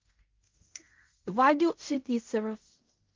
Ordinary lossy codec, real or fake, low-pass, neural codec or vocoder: Opus, 32 kbps; fake; 7.2 kHz; codec, 16 kHz in and 24 kHz out, 0.4 kbps, LongCat-Audio-Codec, fine tuned four codebook decoder